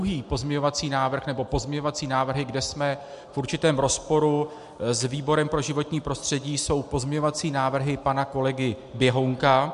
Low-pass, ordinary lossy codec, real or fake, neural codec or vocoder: 10.8 kHz; MP3, 64 kbps; real; none